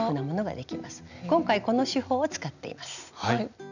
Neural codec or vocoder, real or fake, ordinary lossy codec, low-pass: none; real; none; 7.2 kHz